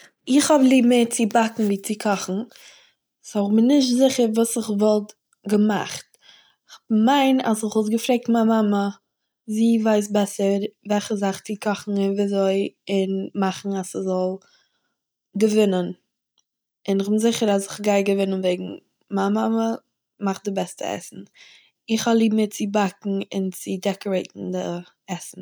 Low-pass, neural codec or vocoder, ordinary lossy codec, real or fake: none; none; none; real